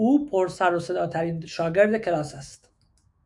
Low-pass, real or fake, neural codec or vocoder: 10.8 kHz; fake; autoencoder, 48 kHz, 128 numbers a frame, DAC-VAE, trained on Japanese speech